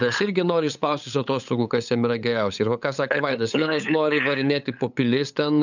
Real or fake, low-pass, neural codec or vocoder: fake; 7.2 kHz; codec, 16 kHz, 8 kbps, FunCodec, trained on LibriTTS, 25 frames a second